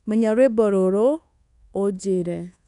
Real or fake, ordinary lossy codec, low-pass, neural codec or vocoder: fake; none; 10.8 kHz; codec, 24 kHz, 1.2 kbps, DualCodec